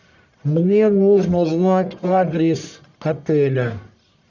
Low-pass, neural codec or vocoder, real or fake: 7.2 kHz; codec, 44.1 kHz, 1.7 kbps, Pupu-Codec; fake